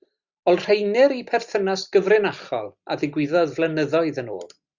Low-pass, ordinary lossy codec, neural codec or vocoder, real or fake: 7.2 kHz; Opus, 64 kbps; none; real